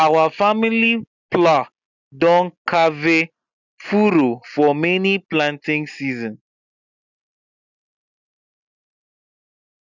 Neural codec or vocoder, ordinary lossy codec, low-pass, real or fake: none; none; 7.2 kHz; real